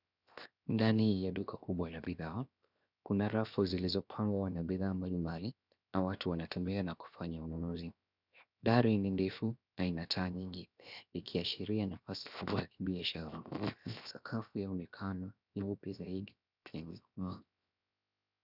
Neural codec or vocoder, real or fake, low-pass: codec, 16 kHz, 0.7 kbps, FocalCodec; fake; 5.4 kHz